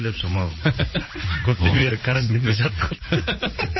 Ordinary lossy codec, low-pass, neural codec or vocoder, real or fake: MP3, 24 kbps; 7.2 kHz; vocoder, 44.1 kHz, 80 mel bands, Vocos; fake